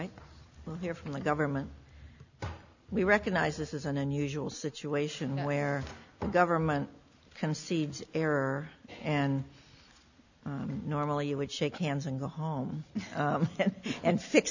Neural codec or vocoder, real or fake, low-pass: none; real; 7.2 kHz